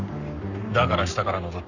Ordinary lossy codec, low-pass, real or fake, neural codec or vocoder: none; 7.2 kHz; fake; codec, 44.1 kHz, 7.8 kbps, Pupu-Codec